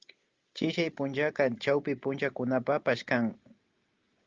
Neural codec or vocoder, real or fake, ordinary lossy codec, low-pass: none; real; Opus, 32 kbps; 7.2 kHz